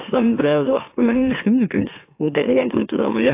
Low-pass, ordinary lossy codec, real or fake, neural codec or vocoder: 3.6 kHz; AAC, 24 kbps; fake; autoencoder, 44.1 kHz, a latent of 192 numbers a frame, MeloTTS